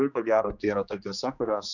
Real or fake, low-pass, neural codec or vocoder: fake; 7.2 kHz; codec, 16 kHz, 2 kbps, X-Codec, HuBERT features, trained on general audio